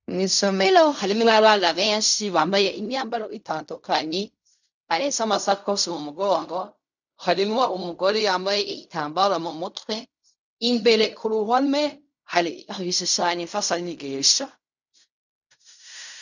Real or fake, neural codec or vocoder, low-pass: fake; codec, 16 kHz in and 24 kHz out, 0.4 kbps, LongCat-Audio-Codec, fine tuned four codebook decoder; 7.2 kHz